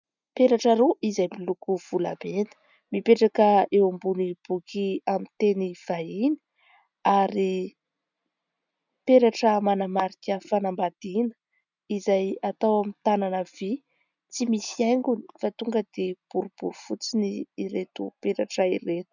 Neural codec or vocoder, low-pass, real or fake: none; 7.2 kHz; real